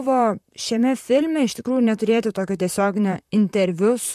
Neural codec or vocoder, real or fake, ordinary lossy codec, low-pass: vocoder, 44.1 kHz, 128 mel bands, Pupu-Vocoder; fake; MP3, 96 kbps; 14.4 kHz